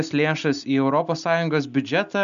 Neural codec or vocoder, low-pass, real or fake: none; 7.2 kHz; real